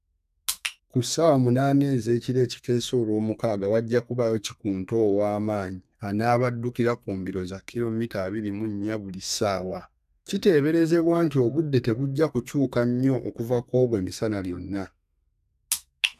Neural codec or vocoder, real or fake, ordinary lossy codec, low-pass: codec, 32 kHz, 1.9 kbps, SNAC; fake; none; 14.4 kHz